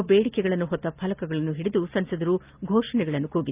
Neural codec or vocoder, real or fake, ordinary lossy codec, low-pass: none; real; Opus, 24 kbps; 3.6 kHz